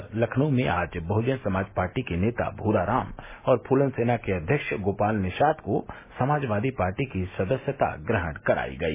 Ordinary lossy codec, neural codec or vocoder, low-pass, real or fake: MP3, 16 kbps; none; 3.6 kHz; real